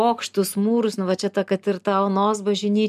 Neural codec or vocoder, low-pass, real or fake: none; 14.4 kHz; real